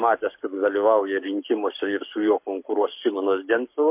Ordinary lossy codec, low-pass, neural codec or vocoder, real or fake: MP3, 32 kbps; 3.6 kHz; codec, 44.1 kHz, 7.8 kbps, Pupu-Codec; fake